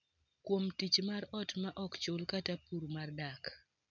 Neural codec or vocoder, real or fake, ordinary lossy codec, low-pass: none; real; none; 7.2 kHz